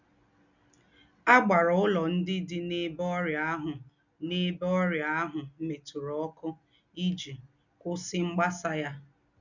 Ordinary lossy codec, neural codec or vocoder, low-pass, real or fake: none; none; 7.2 kHz; real